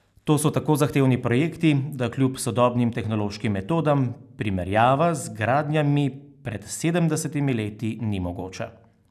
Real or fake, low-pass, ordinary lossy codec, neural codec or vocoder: real; 14.4 kHz; none; none